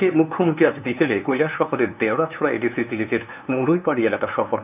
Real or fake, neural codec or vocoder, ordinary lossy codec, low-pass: fake; codec, 16 kHz, 2 kbps, FunCodec, trained on LibriTTS, 25 frames a second; none; 3.6 kHz